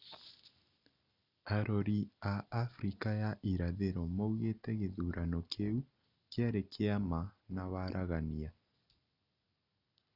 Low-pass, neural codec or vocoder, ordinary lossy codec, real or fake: 5.4 kHz; none; none; real